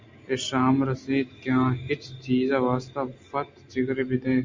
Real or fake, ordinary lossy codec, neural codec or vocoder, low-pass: real; MP3, 64 kbps; none; 7.2 kHz